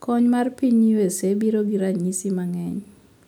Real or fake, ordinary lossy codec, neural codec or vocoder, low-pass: real; none; none; 19.8 kHz